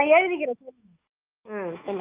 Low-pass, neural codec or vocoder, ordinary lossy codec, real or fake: 3.6 kHz; autoencoder, 48 kHz, 128 numbers a frame, DAC-VAE, trained on Japanese speech; Opus, 24 kbps; fake